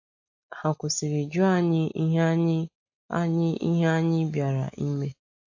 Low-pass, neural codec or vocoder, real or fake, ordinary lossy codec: 7.2 kHz; none; real; AAC, 48 kbps